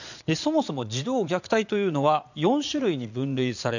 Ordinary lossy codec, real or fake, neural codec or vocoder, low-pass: none; fake; vocoder, 22.05 kHz, 80 mel bands, Vocos; 7.2 kHz